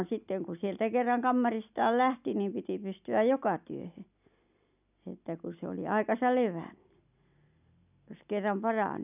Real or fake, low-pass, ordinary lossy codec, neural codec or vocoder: real; 3.6 kHz; none; none